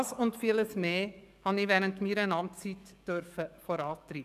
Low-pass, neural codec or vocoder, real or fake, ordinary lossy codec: 14.4 kHz; codec, 44.1 kHz, 7.8 kbps, DAC; fake; none